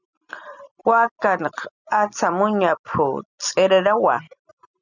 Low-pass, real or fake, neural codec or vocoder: 7.2 kHz; real; none